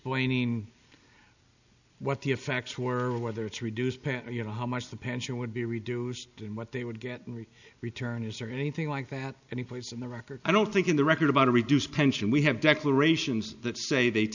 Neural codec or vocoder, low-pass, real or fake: none; 7.2 kHz; real